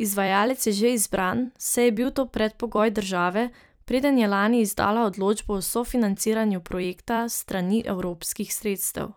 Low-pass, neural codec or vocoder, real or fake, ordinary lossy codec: none; vocoder, 44.1 kHz, 128 mel bands every 256 samples, BigVGAN v2; fake; none